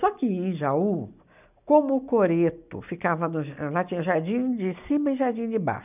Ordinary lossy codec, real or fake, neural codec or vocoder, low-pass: none; real; none; 3.6 kHz